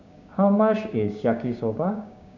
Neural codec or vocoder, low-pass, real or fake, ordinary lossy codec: codec, 16 kHz, 6 kbps, DAC; 7.2 kHz; fake; none